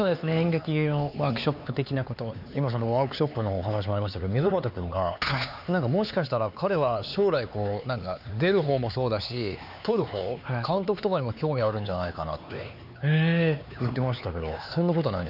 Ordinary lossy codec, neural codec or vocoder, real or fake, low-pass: none; codec, 16 kHz, 4 kbps, X-Codec, HuBERT features, trained on LibriSpeech; fake; 5.4 kHz